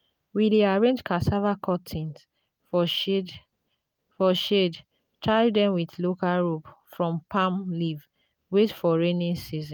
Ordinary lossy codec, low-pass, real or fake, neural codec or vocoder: none; none; real; none